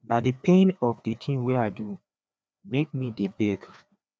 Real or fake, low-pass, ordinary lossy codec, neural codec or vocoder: fake; none; none; codec, 16 kHz, 2 kbps, FreqCodec, larger model